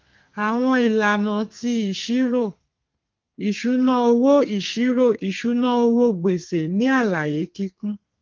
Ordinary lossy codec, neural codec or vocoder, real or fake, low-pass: Opus, 24 kbps; codec, 32 kHz, 1.9 kbps, SNAC; fake; 7.2 kHz